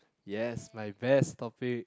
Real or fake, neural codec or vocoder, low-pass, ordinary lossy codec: real; none; none; none